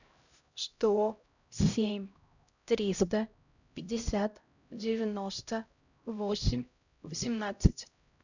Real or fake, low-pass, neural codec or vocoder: fake; 7.2 kHz; codec, 16 kHz, 0.5 kbps, X-Codec, HuBERT features, trained on LibriSpeech